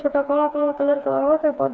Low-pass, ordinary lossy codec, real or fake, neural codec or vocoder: none; none; fake; codec, 16 kHz, 4 kbps, FreqCodec, smaller model